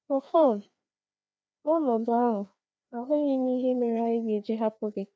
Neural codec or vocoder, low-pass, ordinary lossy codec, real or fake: codec, 16 kHz, 2 kbps, FreqCodec, larger model; none; none; fake